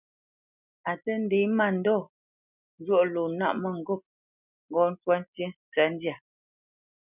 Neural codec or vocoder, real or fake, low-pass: none; real; 3.6 kHz